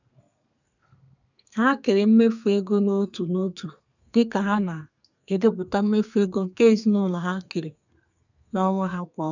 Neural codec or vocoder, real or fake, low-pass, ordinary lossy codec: codec, 32 kHz, 1.9 kbps, SNAC; fake; 7.2 kHz; none